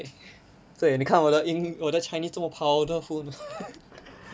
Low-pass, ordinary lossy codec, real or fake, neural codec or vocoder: none; none; real; none